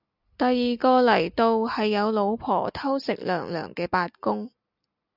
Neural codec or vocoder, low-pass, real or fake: none; 5.4 kHz; real